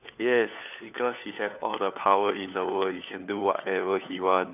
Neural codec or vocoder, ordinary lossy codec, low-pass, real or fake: codec, 16 kHz, 4 kbps, FunCodec, trained on LibriTTS, 50 frames a second; none; 3.6 kHz; fake